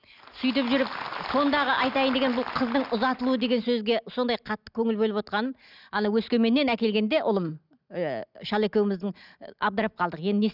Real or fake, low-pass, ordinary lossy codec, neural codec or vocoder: real; 5.4 kHz; none; none